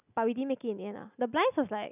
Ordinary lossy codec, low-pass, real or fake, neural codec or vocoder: none; 3.6 kHz; real; none